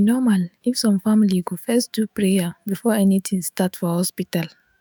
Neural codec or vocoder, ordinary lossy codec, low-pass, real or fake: autoencoder, 48 kHz, 128 numbers a frame, DAC-VAE, trained on Japanese speech; none; none; fake